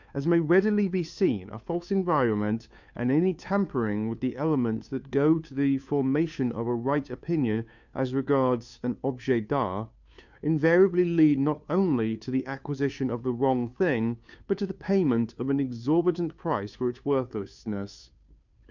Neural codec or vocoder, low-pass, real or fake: codec, 16 kHz, 2 kbps, FunCodec, trained on Chinese and English, 25 frames a second; 7.2 kHz; fake